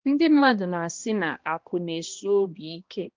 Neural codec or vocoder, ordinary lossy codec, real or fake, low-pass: codec, 16 kHz, 1 kbps, X-Codec, HuBERT features, trained on balanced general audio; Opus, 32 kbps; fake; 7.2 kHz